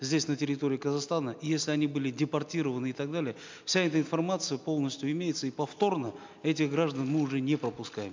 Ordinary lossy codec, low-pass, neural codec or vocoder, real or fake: MP3, 64 kbps; 7.2 kHz; none; real